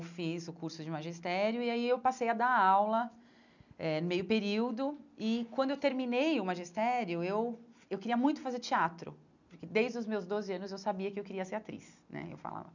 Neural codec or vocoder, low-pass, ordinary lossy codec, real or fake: none; 7.2 kHz; none; real